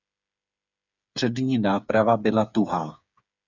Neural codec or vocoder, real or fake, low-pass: codec, 16 kHz, 8 kbps, FreqCodec, smaller model; fake; 7.2 kHz